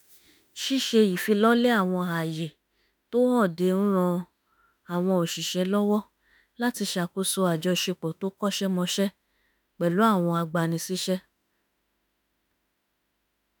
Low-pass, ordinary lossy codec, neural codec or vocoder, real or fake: none; none; autoencoder, 48 kHz, 32 numbers a frame, DAC-VAE, trained on Japanese speech; fake